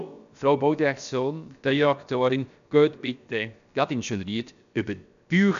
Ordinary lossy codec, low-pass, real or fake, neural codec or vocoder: none; 7.2 kHz; fake; codec, 16 kHz, about 1 kbps, DyCAST, with the encoder's durations